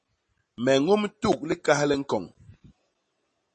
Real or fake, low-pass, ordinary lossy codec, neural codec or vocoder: real; 10.8 kHz; MP3, 32 kbps; none